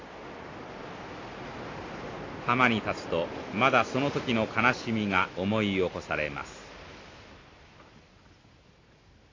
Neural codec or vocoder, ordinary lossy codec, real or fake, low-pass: none; AAC, 32 kbps; real; 7.2 kHz